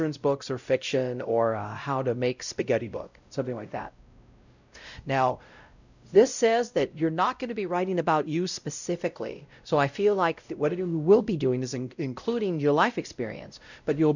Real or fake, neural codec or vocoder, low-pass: fake; codec, 16 kHz, 0.5 kbps, X-Codec, WavLM features, trained on Multilingual LibriSpeech; 7.2 kHz